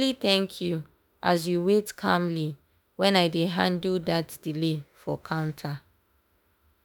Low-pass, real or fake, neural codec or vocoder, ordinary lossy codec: none; fake; autoencoder, 48 kHz, 32 numbers a frame, DAC-VAE, trained on Japanese speech; none